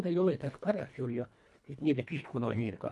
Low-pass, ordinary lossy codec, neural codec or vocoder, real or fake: none; none; codec, 24 kHz, 1.5 kbps, HILCodec; fake